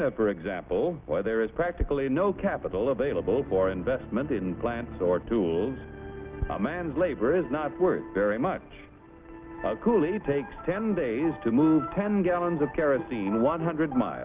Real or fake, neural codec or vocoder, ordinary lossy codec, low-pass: real; none; Opus, 32 kbps; 3.6 kHz